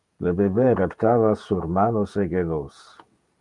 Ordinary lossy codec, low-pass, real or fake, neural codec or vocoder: Opus, 32 kbps; 10.8 kHz; fake; vocoder, 48 kHz, 128 mel bands, Vocos